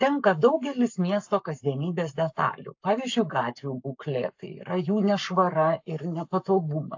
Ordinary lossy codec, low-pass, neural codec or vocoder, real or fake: AAC, 48 kbps; 7.2 kHz; codec, 44.1 kHz, 7.8 kbps, Pupu-Codec; fake